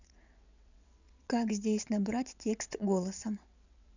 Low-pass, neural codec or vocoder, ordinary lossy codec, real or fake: 7.2 kHz; vocoder, 22.05 kHz, 80 mel bands, WaveNeXt; none; fake